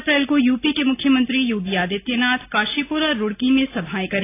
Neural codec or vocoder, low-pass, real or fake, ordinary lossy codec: none; 3.6 kHz; real; AAC, 24 kbps